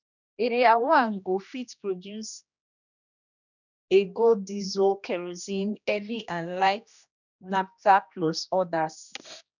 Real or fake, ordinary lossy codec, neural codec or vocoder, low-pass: fake; none; codec, 16 kHz, 1 kbps, X-Codec, HuBERT features, trained on general audio; 7.2 kHz